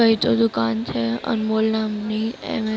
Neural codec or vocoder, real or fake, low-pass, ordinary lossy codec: none; real; none; none